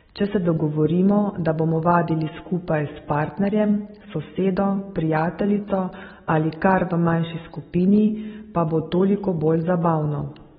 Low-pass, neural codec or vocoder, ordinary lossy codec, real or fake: 19.8 kHz; none; AAC, 16 kbps; real